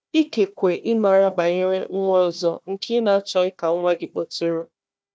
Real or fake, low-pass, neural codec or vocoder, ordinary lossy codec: fake; none; codec, 16 kHz, 1 kbps, FunCodec, trained on Chinese and English, 50 frames a second; none